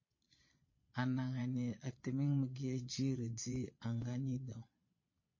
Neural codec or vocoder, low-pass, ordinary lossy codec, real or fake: vocoder, 22.05 kHz, 80 mel bands, Vocos; 7.2 kHz; MP3, 32 kbps; fake